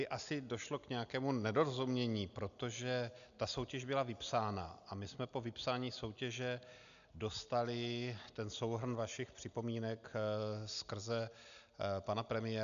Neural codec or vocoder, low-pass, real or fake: none; 7.2 kHz; real